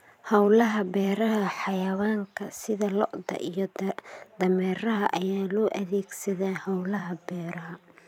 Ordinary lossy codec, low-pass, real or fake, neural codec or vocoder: none; 19.8 kHz; fake; vocoder, 44.1 kHz, 128 mel bands every 512 samples, BigVGAN v2